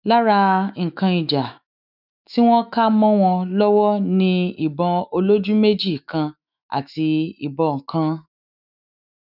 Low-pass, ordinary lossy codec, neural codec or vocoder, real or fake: 5.4 kHz; none; autoencoder, 48 kHz, 128 numbers a frame, DAC-VAE, trained on Japanese speech; fake